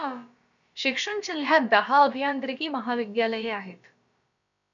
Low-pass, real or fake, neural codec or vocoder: 7.2 kHz; fake; codec, 16 kHz, about 1 kbps, DyCAST, with the encoder's durations